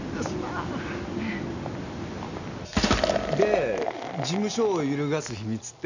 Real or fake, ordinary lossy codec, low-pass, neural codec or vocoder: real; none; 7.2 kHz; none